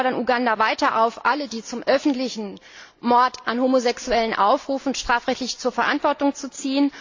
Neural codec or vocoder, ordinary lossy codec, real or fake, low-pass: none; AAC, 48 kbps; real; 7.2 kHz